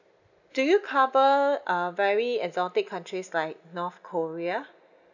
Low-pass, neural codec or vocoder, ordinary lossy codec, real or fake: 7.2 kHz; none; none; real